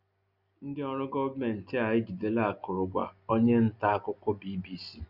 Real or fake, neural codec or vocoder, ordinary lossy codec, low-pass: real; none; Opus, 64 kbps; 5.4 kHz